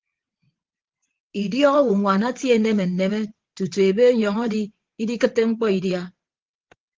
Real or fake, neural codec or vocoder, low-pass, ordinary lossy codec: fake; vocoder, 22.05 kHz, 80 mel bands, WaveNeXt; 7.2 kHz; Opus, 16 kbps